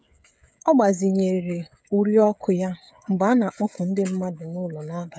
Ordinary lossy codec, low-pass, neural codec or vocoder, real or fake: none; none; codec, 16 kHz, 16 kbps, FreqCodec, smaller model; fake